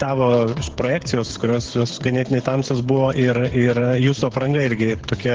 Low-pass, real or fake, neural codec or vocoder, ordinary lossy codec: 7.2 kHz; fake; codec, 16 kHz, 8 kbps, FreqCodec, smaller model; Opus, 16 kbps